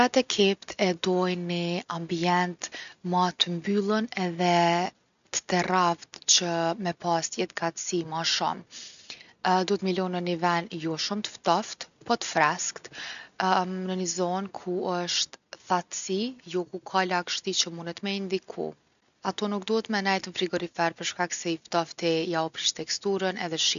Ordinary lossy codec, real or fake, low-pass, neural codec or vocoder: none; real; 7.2 kHz; none